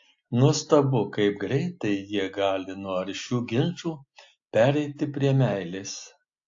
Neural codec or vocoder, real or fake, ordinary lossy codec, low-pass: none; real; AAC, 48 kbps; 7.2 kHz